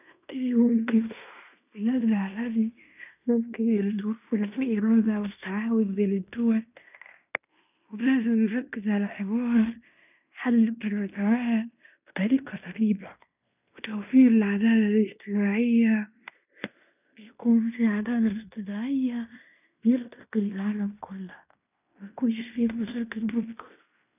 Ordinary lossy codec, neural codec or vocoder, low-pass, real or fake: none; codec, 16 kHz in and 24 kHz out, 0.9 kbps, LongCat-Audio-Codec, fine tuned four codebook decoder; 3.6 kHz; fake